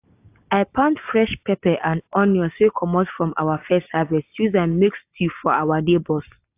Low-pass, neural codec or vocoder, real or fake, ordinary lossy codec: 3.6 kHz; none; real; AAC, 32 kbps